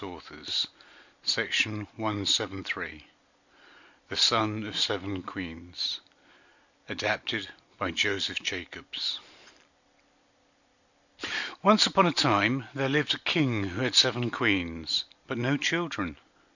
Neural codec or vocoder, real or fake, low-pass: none; real; 7.2 kHz